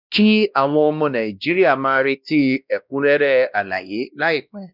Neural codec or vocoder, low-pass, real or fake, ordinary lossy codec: codec, 16 kHz, 1 kbps, X-Codec, WavLM features, trained on Multilingual LibriSpeech; 5.4 kHz; fake; none